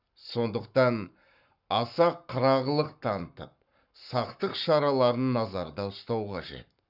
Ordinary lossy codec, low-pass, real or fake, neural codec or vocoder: none; 5.4 kHz; fake; codec, 44.1 kHz, 7.8 kbps, Pupu-Codec